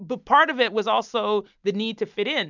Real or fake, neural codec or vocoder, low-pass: real; none; 7.2 kHz